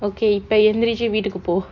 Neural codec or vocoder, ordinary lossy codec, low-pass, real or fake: none; none; 7.2 kHz; real